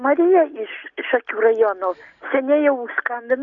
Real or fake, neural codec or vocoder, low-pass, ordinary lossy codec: real; none; 7.2 kHz; Opus, 64 kbps